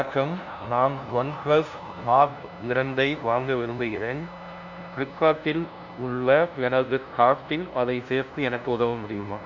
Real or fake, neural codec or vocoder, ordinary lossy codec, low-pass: fake; codec, 16 kHz, 0.5 kbps, FunCodec, trained on LibriTTS, 25 frames a second; none; 7.2 kHz